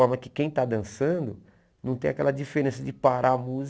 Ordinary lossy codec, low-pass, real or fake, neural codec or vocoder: none; none; real; none